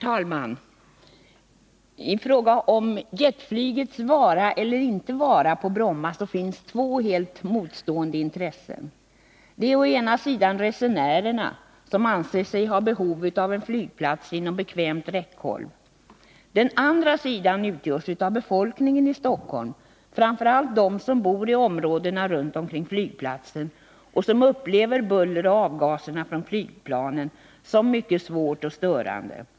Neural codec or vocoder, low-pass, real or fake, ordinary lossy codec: none; none; real; none